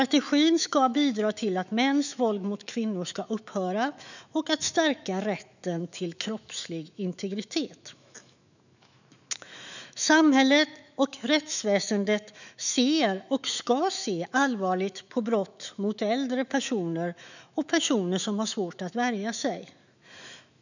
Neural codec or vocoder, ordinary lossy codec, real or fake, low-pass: autoencoder, 48 kHz, 128 numbers a frame, DAC-VAE, trained on Japanese speech; none; fake; 7.2 kHz